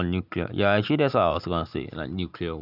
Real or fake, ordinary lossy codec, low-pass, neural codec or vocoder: real; none; 5.4 kHz; none